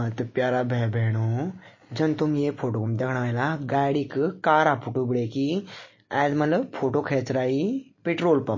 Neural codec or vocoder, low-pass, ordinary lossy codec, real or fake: none; 7.2 kHz; MP3, 32 kbps; real